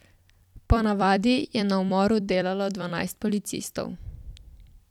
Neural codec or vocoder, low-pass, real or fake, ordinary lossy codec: vocoder, 44.1 kHz, 128 mel bands every 256 samples, BigVGAN v2; 19.8 kHz; fake; none